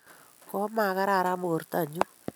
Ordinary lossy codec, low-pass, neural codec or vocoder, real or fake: none; none; none; real